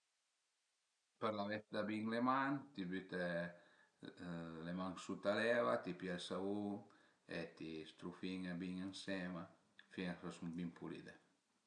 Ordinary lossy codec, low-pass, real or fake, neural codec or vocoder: none; 9.9 kHz; real; none